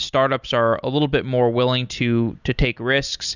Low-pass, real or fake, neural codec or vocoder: 7.2 kHz; fake; vocoder, 44.1 kHz, 128 mel bands every 256 samples, BigVGAN v2